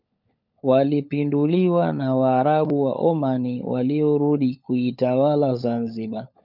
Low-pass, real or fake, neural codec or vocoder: 5.4 kHz; fake; codec, 16 kHz, 16 kbps, FunCodec, trained on LibriTTS, 50 frames a second